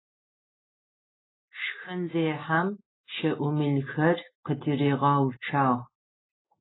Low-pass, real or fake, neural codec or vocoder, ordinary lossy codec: 7.2 kHz; real; none; AAC, 16 kbps